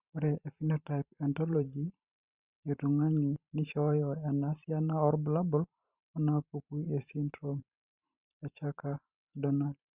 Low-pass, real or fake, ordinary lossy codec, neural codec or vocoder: 3.6 kHz; fake; Opus, 64 kbps; vocoder, 44.1 kHz, 128 mel bands every 512 samples, BigVGAN v2